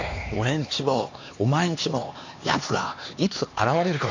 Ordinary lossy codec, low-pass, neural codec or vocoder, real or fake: AAC, 48 kbps; 7.2 kHz; codec, 16 kHz, 2 kbps, X-Codec, HuBERT features, trained on LibriSpeech; fake